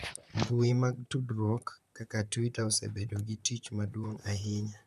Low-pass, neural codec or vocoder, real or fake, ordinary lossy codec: 14.4 kHz; vocoder, 44.1 kHz, 128 mel bands, Pupu-Vocoder; fake; none